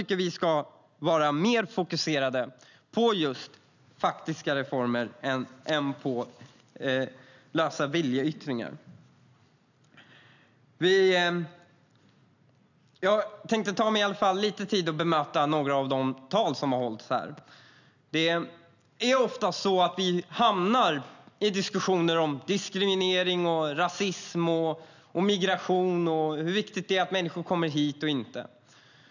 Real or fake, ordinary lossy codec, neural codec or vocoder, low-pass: real; none; none; 7.2 kHz